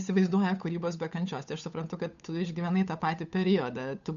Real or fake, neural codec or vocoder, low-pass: fake; codec, 16 kHz, 8 kbps, FunCodec, trained on Chinese and English, 25 frames a second; 7.2 kHz